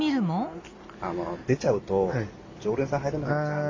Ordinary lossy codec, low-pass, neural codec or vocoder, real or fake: MP3, 48 kbps; 7.2 kHz; none; real